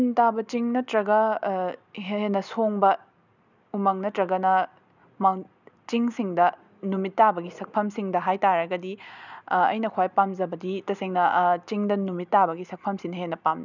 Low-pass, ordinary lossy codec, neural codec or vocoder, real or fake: 7.2 kHz; none; none; real